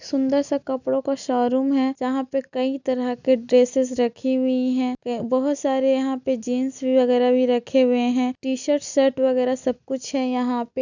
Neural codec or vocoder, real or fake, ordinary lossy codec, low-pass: none; real; none; 7.2 kHz